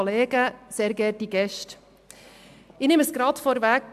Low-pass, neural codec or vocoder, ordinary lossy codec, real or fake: 14.4 kHz; none; AAC, 96 kbps; real